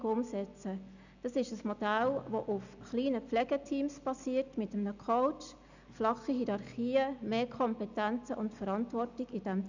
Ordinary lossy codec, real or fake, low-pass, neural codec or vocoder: none; real; 7.2 kHz; none